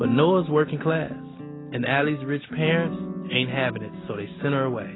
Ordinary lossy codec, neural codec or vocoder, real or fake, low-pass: AAC, 16 kbps; none; real; 7.2 kHz